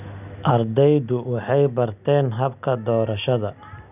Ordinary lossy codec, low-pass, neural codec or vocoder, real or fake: none; 3.6 kHz; none; real